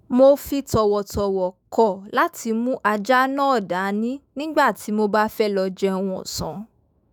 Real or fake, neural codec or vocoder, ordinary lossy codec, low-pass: fake; autoencoder, 48 kHz, 128 numbers a frame, DAC-VAE, trained on Japanese speech; none; none